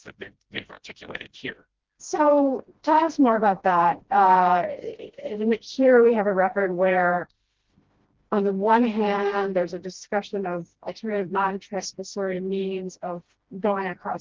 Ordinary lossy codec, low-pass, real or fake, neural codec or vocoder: Opus, 16 kbps; 7.2 kHz; fake; codec, 16 kHz, 1 kbps, FreqCodec, smaller model